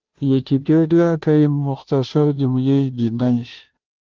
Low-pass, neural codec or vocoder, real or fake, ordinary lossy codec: 7.2 kHz; codec, 16 kHz, 0.5 kbps, FunCodec, trained on Chinese and English, 25 frames a second; fake; Opus, 16 kbps